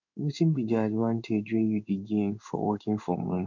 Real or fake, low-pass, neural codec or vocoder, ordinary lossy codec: fake; 7.2 kHz; codec, 16 kHz in and 24 kHz out, 1 kbps, XY-Tokenizer; none